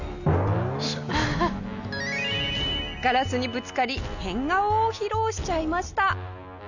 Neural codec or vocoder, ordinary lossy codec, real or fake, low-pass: none; none; real; 7.2 kHz